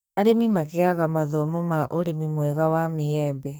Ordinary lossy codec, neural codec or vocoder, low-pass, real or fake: none; codec, 44.1 kHz, 2.6 kbps, SNAC; none; fake